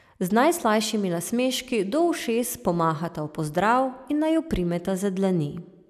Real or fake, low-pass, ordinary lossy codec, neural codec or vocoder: real; 14.4 kHz; none; none